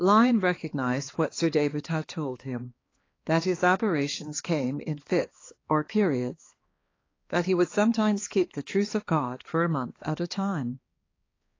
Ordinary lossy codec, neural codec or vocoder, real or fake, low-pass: AAC, 32 kbps; codec, 16 kHz, 2 kbps, X-Codec, HuBERT features, trained on balanced general audio; fake; 7.2 kHz